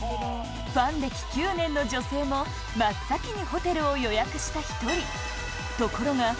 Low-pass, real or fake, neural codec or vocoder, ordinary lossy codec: none; real; none; none